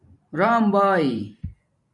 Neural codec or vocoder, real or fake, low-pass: vocoder, 44.1 kHz, 128 mel bands every 512 samples, BigVGAN v2; fake; 10.8 kHz